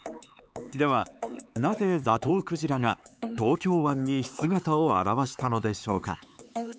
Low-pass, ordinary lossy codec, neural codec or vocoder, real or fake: none; none; codec, 16 kHz, 4 kbps, X-Codec, HuBERT features, trained on LibriSpeech; fake